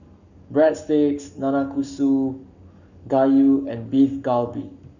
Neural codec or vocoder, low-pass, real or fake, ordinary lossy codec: codec, 44.1 kHz, 7.8 kbps, Pupu-Codec; 7.2 kHz; fake; none